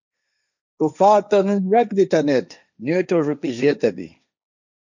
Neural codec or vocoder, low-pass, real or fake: codec, 16 kHz, 1.1 kbps, Voila-Tokenizer; 7.2 kHz; fake